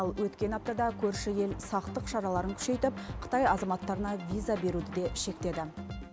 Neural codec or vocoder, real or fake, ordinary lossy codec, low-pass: none; real; none; none